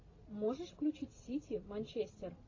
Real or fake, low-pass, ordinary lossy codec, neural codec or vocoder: real; 7.2 kHz; AAC, 32 kbps; none